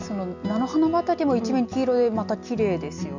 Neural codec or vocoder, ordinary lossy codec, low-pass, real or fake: none; none; 7.2 kHz; real